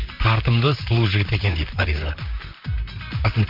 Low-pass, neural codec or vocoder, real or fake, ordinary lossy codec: 5.4 kHz; vocoder, 44.1 kHz, 128 mel bands, Pupu-Vocoder; fake; MP3, 48 kbps